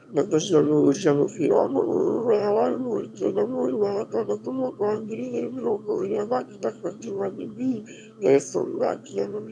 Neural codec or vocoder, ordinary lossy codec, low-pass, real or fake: autoencoder, 22.05 kHz, a latent of 192 numbers a frame, VITS, trained on one speaker; none; none; fake